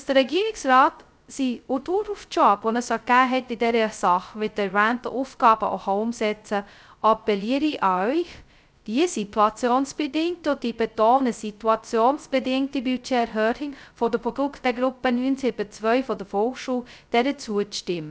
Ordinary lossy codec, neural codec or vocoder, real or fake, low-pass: none; codec, 16 kHz, 0.2 kbps, FocalCodec; fake; none